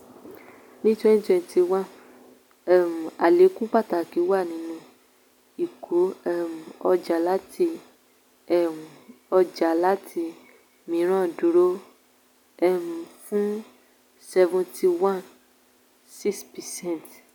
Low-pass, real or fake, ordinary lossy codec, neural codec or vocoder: 19.8 kHz; real; none; none